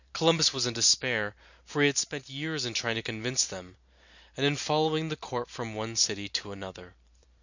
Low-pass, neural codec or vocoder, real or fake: 7.2 kHz; vocoder, 44.1 kHz, 128 mel bands every 512 samples, BigVGAN v2; fake